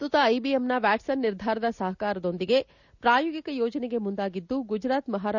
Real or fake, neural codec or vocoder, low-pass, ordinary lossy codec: real; none; 7.2 kHz; none